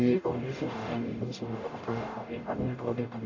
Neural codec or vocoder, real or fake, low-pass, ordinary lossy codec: codec, 44.1 kHz, 0.9 kbps, DAC; fake; 7.2 kHz; AAC, 48 kbps